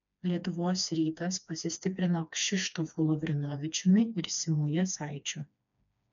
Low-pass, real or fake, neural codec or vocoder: 7.2 kHz; fake; codec, 16 kHz, 2 kbps, FreqCodec, smaller model